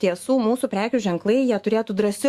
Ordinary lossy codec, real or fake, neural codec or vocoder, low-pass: Opus, 64 kbps; fake; autoencoder, 48 kHz, 128 numbers a frame, DAC-VAE, trained on Japanese speech; 14.4 kHz